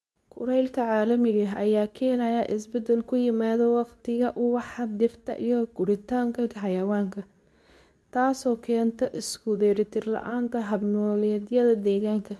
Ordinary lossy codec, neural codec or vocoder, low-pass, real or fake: none; codec, 24 kHz, 0.9 kbps, WavTokenizer, medium speech release version 2; none; fake